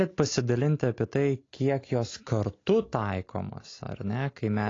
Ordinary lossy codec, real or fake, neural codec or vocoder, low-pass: AAC, 32 kbps; real; none; 7.2 kHz